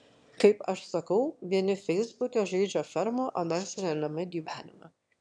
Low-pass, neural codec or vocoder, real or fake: 9.9 kHz; autoencoder, 22.05 kHz, a latent of 192 numbers a frame, VITS, trained on one speaker; fake